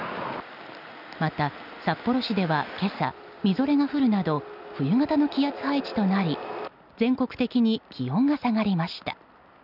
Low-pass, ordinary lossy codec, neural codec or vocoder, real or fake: 5.4 kHz; none; none; real